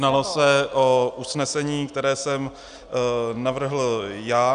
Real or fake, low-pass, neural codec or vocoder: real; 9.9 kHz; none